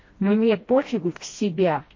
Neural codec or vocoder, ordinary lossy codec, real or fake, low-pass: codec, 16 kHz, 1 kbps, FreqCodec, smaller model; MP3, 32 kbps; fake; 7.2 kHz